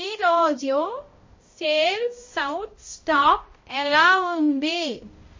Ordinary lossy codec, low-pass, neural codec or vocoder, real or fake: MP3, 32 kbps; 7.2 kHz; codec, 16 kHz, 0.5 kbps, X-Codec, HuBERT features, trained on balanced general audio; fake